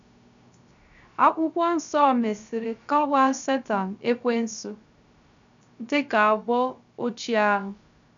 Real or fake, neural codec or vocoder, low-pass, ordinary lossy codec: fake; codec, 16 kHz, 0.3 kbps, FocalCodec; 7.2 kHz; none